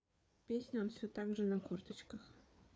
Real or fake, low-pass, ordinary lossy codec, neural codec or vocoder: fake; none; none; codec, 16 kHz, 4 kbps, FreqCodec, larger model